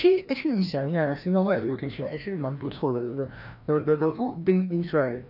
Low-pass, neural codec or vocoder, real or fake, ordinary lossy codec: 5.4 kHz; codec, 16 kHz, 1 kbps, FreqCodec, larger model; fake; none